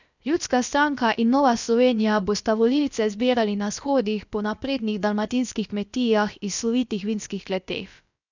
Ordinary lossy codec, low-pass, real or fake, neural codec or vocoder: none; 7.2 kHz; fake; codec, 16 kHz, about 1 kbps, DyCAST, with the encoder's durations